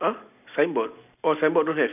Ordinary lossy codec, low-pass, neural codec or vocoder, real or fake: none; 3.6 kHz; none; real